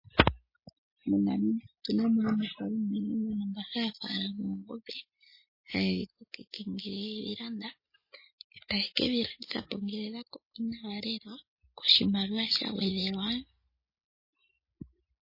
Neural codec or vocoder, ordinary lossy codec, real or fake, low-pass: none; MP3, 24 kbps; real; 5.4 kHz